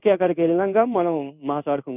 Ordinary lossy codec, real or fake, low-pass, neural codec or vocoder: none; fake; 3.6 kHz; codec, 16 kHz in and 24 kHz out, 1 kbps, XY-Tokenizer